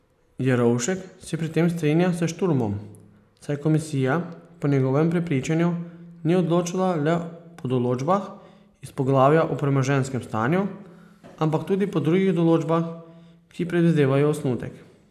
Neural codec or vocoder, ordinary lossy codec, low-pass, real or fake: none; none; 14.4 kHz; real